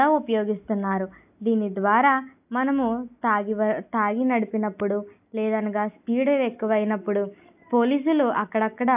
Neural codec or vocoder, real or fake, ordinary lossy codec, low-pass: none; real; AAC, 32 kbps; 3.6 kHz